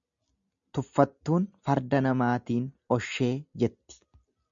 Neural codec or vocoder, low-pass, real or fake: none; 7.2 kHz; real